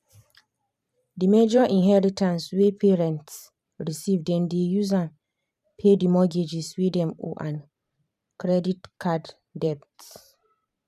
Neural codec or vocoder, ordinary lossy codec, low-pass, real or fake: vocoder, 44.1 kHz, 128 mel bands every 512 samples, BigVGAN v2; none; 14.4 kHz; fake